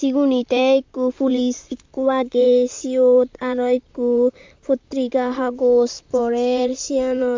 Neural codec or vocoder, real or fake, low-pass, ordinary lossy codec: vocoder, 44.1 kHz, 128 mel bands, Pupu-Vocoder; fake; 7.2 kHz; MP3, 64 kbps